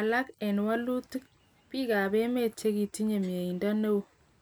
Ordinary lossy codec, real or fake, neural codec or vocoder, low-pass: none; real; none; none